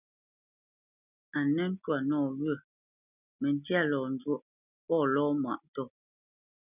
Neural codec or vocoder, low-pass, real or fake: none; 3.6 kHz; real